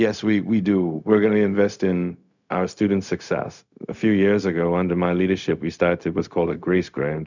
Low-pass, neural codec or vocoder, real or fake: 7.2 kHz; codec, 16 kHz, 0.4 kbps, LongCat-Audio-Codec; fake